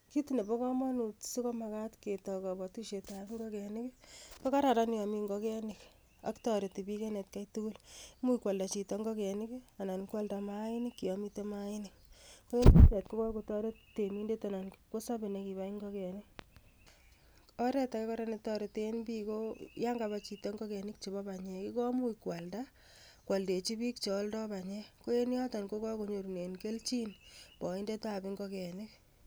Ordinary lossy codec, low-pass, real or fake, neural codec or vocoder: none; none; real; none